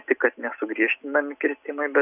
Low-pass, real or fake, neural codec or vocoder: 3.6 kHz; real; none